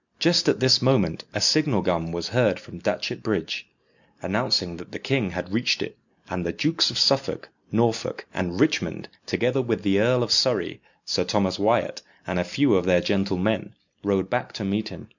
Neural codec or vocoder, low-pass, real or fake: none; 7.2 kHz; real